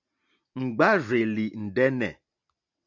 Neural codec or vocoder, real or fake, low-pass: none; real; 7.2 kHz